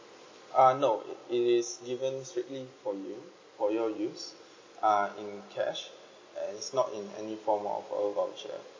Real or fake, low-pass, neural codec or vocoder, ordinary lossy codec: real; 7.2 kHz; none; MP3, 32 kbps